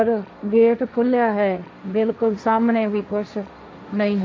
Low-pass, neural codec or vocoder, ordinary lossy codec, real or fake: none; codec, 16 kHz, 1.1 kbps, Voila-Tokenizer; none; fake